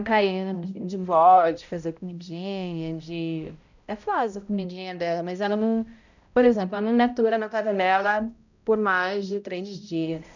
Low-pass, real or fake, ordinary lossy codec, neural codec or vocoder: 7.2 kHz; fake; none; codec, 16 kHz, 0.5 kbps, X-Codec, HuBERT features, trained on balanced general audio